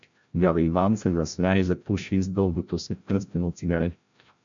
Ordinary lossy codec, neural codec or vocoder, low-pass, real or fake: MP3, 64 kbps; codec, 16 kHz, 0.5 kbps, FreqCodec, larger model; 7.2 kHz; fake